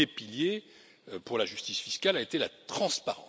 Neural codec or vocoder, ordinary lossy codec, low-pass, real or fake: none; none; none; real